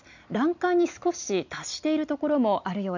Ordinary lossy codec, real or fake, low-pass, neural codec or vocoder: none; real; 7.2 kHz; none